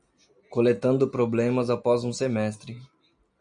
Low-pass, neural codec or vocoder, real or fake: 9.9 kHz; none; real